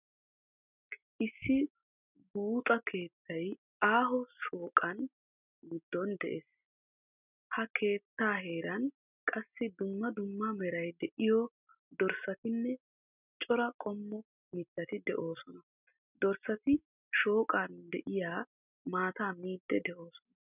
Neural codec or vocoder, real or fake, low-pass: none; real; 3.6 kHz